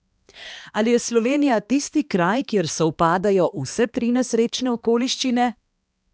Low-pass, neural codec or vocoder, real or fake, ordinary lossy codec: none; codec, 16 kHz, 2 kbps, X-Codec, HuBERT features, trained on balanced general audio; fake; none